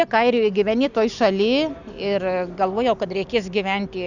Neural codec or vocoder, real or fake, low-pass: none; real; 7.2 kHz